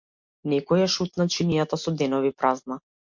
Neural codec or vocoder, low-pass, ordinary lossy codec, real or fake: none; 7.2 kHz; MP3, 48 kbps; real